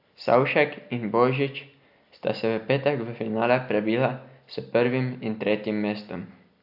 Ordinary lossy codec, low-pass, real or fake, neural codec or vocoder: AAC, 48 kbps; 5.4 kHz; real; none